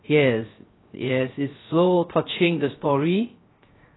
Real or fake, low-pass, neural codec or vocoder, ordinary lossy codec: fake; 7.2 kHz; codec, 16 kHz, 0.3 kbps, FocalCodec; AAC, 16 kbps